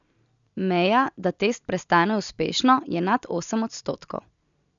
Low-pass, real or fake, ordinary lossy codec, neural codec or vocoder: 7.2 kHz; real; none; none